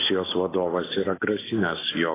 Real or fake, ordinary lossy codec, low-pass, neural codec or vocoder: real; AAC, 16 kbps; 3.6 kHz; none